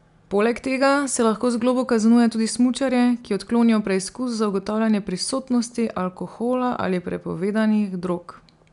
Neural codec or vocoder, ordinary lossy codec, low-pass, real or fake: none; none; 10.8 kHz; real